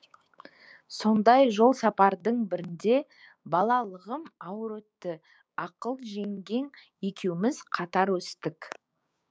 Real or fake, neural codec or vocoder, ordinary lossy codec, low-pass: fake; codec, 16 kHz, 6 kbps, DAC; none; none